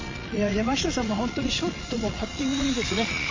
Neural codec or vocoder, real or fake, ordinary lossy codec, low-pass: vocoder, 22.05 kHz, 80 mel bands, Vocos; fake; MP3, 32 kbps; 7.2 kHz